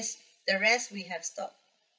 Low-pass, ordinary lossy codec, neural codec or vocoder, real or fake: none; none; codec, 16 kHz, 16 kbps, FreqCodec, larger model; fake